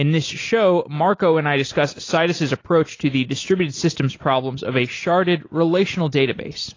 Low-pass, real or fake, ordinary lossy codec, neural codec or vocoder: 7.2 kHz; real; AAC, 32 kbps; none